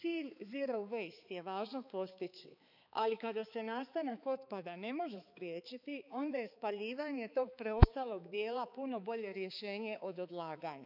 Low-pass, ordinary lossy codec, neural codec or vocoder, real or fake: 5.4 kHz; none; codec, 16 kHz, 4 kbps, X-Codec, HuBERT features, trained on balanced general audio; fake